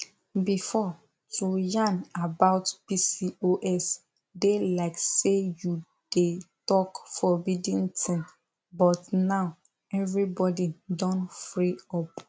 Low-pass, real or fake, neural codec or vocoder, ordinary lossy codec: none; real; none; none